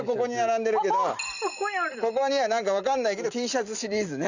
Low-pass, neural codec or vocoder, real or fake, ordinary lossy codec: 7.2 kHz; none; real; none